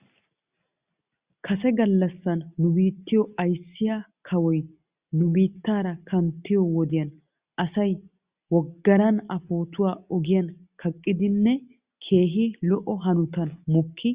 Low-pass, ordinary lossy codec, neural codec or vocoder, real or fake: 3.6 kHz; Opus, 64 kbps; none; real